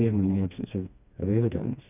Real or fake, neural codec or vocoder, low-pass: fake; codec, 16 kHz, 2 kbps, FreqCodec, smaller model; 3.6 kHz